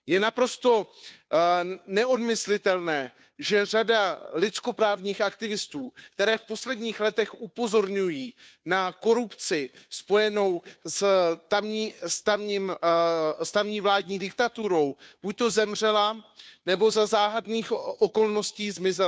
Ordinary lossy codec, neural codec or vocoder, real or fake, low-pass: none; codec, 16 kHz, 2 kbps, FunCodec, trained on Chinese and English, 25 frames a second; fake; none